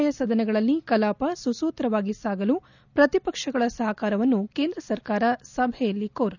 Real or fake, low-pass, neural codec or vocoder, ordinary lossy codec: real; 7.2 kHz; none; none